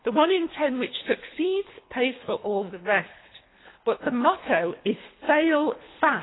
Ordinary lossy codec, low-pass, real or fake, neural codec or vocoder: AAC, 16 kbps; 7.2 kHz; fake; codec, 24 kHz, 3 kbps, HILCodec